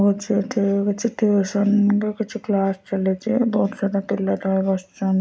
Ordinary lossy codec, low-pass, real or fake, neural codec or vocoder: none; none; real; none